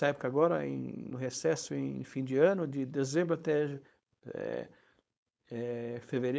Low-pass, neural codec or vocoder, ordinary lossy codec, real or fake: none; codec, 16 kHz, 4.8 kbps, FACodec; none; fake